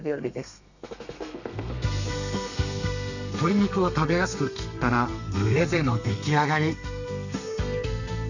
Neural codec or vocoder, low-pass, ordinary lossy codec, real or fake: codec, 32 kHz, 1.9 kbps, SNAC; 7.2 kHz; none; fake